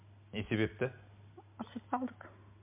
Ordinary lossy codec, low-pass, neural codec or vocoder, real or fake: MP3, 32 kbps; 3.6 kHz; none; real